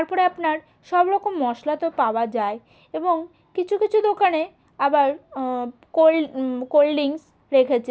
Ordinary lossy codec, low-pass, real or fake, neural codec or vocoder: none; none; real; none